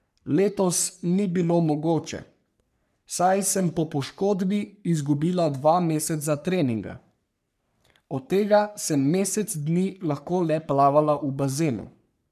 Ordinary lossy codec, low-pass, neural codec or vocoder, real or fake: none; 14.4 kHz; codec, 44.1 kHz, 3.4 kbps, Pupu-Codec; fake